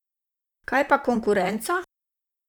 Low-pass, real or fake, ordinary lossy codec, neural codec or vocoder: 19.8 kHz; fake; none; vocoder, 44.1 kHz, 128 mel bands, Pupu-Vocoder